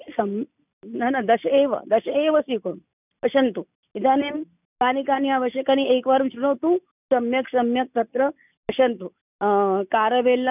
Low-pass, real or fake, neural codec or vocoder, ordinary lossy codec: 3.6 kHz; real; none; none